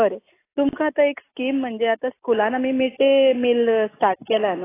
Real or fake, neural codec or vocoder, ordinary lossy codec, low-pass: real; none; AAC, 16 kbps; 3.6 kHz